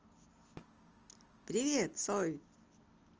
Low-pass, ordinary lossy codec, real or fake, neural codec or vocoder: 7.2 kHz; Opus, 24 kbps; real; none